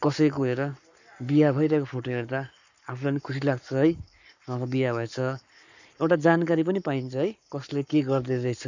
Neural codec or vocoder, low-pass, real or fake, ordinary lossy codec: codec, 44.1 kHz, 7.8 kbps, Pupu-Codec; 7.2 kHz; fake; none